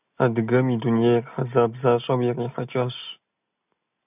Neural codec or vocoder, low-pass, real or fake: none; 3.6 kHz; real